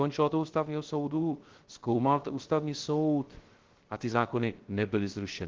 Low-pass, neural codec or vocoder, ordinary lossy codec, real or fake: 7.2 kHz; codec, 16 kHz, 0.3 kbps, FocalCodec; Opus, 16 kbps; fake